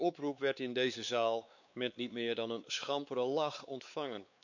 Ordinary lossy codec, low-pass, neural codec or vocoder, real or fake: none; 7.2 kHz; codec, 16 kHz, 4 kbps, X-Codec, WavLM features, trained on Multilingual LibriSpeech; fake